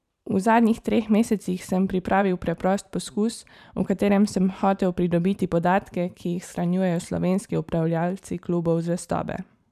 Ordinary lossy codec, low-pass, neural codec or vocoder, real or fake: none; 14.4 kHz; vocoder, 44.1 kHz, 128 mel bands every 512 samples, BigVGAN v2; fake